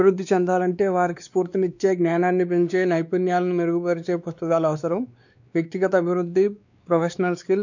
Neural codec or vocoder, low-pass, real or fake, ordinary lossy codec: codec, 16 kHz, 2 kbps, X-Codec, WavLM features, trained on Multilingual LibriSpeech; 7.2 kHz; fake; none